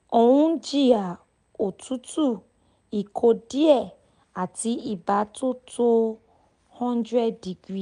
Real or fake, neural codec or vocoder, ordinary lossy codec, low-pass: real; none; none; 9.9 kHz